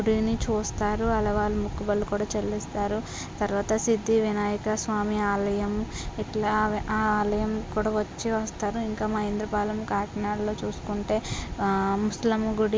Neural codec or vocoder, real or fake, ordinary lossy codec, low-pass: none; real; none; none